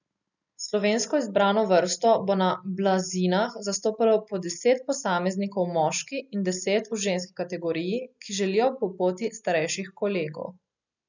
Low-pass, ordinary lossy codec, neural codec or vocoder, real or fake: 7.2 kHz; none; none; real